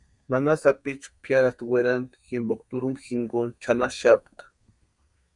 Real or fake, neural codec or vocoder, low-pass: fake; codec, 32 kHz, 1.9 kbps, SNAC; 10.8 kHz